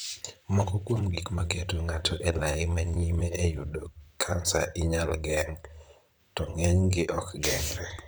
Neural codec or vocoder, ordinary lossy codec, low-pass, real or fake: vocoder, 44.1 kHz, 128 mel bands, Pupu-Vocoder; none; none; fake